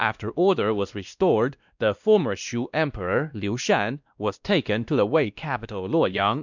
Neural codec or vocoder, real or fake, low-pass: codec, 16 kHz, 1 kbps, X-Codec, WavLM features, trained on Multilingual LibriSpeech; fake; 7.2 kHz